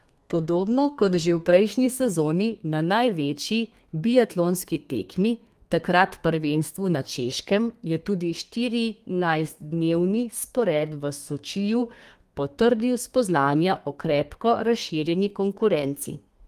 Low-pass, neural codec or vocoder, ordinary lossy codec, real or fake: 14.4 kHz; codec, 32 kHz, 1.9 kbps, SNAC; Opus, 32 kbps; fake